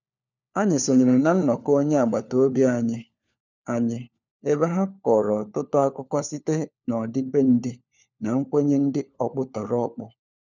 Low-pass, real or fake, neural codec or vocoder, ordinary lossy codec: 7.2 kHz; fake; codec, 16 kHz, 4 kbps, FunCodec, trained on LibriTTS, 50 frames a second; none